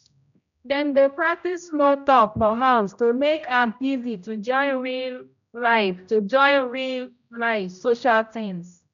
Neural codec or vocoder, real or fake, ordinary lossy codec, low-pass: codec, 16 kHz, 0.5 kbps, X-Codec, HuBERT features, trained on general audio; fake; none; 7.2 kHz